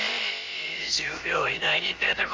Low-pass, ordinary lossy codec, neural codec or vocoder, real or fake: 7.2 kHz; Opus, 32 kbps; codec, 16 kHz, about 1 kbps, DyCAST, with the encoder's durations; fake